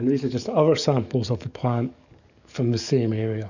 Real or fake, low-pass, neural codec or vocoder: fake; 7.2 kHz; codec, 44.1 kHz, 7.8 kbps, DAC